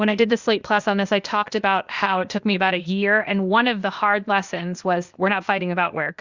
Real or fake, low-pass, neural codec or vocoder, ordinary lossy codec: fake; 7.2 kHz; codec, 16 kHz, 0.8 kbps, ZipCodec; Opus, 64 kbps